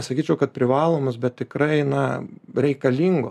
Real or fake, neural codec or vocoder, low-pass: real; none; 14.4 kHz